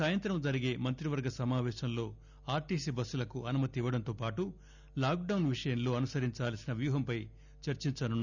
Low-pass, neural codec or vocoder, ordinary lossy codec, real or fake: 7.2 kHz; none; none; real